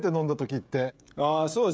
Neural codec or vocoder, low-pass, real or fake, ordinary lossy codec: codec, 16 kHz, 16 kbps, FreqCodec, smaller model; none; fake; none